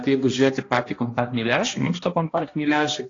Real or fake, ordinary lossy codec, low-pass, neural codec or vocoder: fake; AAC, 32 kbps; 7.2 kHz; codec, 16 kHz, 1 kbps, X-Codec, HuBERT features, trained on balanced general audio